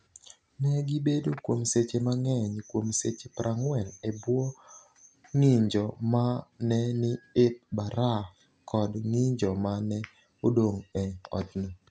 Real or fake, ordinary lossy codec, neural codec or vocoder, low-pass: real; none; none; none